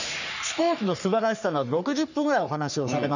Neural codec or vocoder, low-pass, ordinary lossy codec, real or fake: codec, 44.1 kHz, 3.4 kbps, Pupu-Codec; 7.2 kHz; none; fake